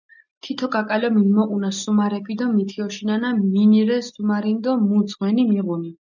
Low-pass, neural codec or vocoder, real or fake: 7.2 kHz; none; real